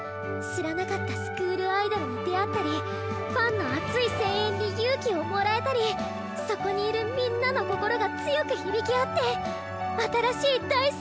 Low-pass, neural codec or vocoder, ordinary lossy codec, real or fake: none; none; none; real